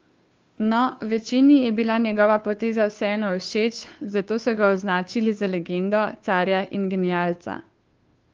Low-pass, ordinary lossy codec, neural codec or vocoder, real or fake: 7.2 kHz; Opus, 32 kbps; codec, 16 kHz, 2 kbps, FunCodec, trained on Chinese and English, 25 frames a second; fake